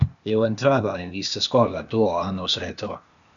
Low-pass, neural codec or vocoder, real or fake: 7.2 kHz; codec, 16 kHz, 0.8 kbps, ZipCodec; fake